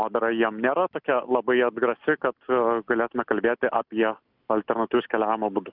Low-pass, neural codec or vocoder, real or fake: 5.4 kHz; none; real